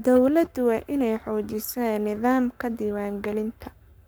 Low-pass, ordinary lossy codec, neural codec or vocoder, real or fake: none; none; codec, 44.1 kHz, 7.8 kbps, Pupu-Codec; fake